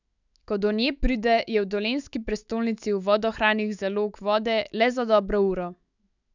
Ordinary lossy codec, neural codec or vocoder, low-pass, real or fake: none; autoencoder, 48 kHz, 128 numbers a frame, DAC-VAE, trained on Japanese speech; 7.2 kHz; fake